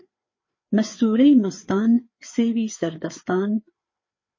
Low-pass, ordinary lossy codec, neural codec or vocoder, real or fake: 7.2 kHz; MP3, 32 kbps; codec, 16 kHz, 4 kbps, FreqCodec, larger model; fake